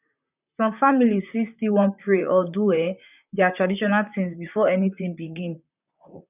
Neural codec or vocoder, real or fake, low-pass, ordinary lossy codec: vocoder, 44.1 kHz, 128 mel bands, Pupu-Vocoder; fake; 3.6 kHz; none